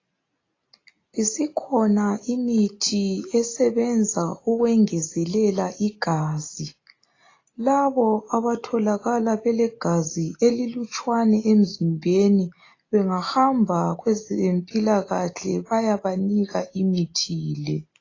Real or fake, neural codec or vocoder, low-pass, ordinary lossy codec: real; none; 7.2 kHz; AAC, 32 kbps